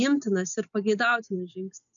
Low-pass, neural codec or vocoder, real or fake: 7.2 kHz; none; real